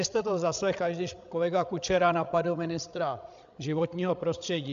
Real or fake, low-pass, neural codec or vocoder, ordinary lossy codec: fake; 7.2 kHz; codec, 16 kHz, 16 kbps, FreqCodec, larger model; AAC, 64 kbps